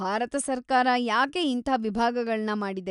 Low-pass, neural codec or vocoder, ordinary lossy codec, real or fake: 10.8 kHz; none; none; real